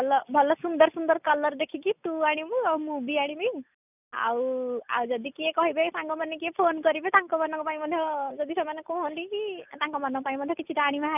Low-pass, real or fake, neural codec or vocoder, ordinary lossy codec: 3.6 kHz; real; none; none